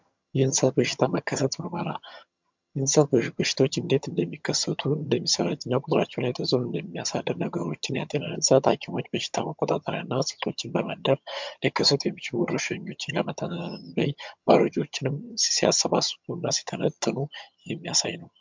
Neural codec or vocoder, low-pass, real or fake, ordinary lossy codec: vocoder, 22.05 kHz, 80 mel bands, HiFi-GAN; 7.2 kHz; fake; MP3, 64 kbps